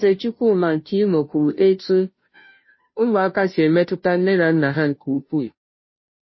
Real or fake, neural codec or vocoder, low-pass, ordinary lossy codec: fake; codec, 16 kHz, 0.5 kbps, FunCodec, trained on Chinese and English, 25 frames a second; 7.2 kHz; MP3, 24 kbps